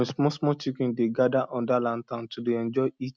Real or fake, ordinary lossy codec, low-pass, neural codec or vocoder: real; none; none; none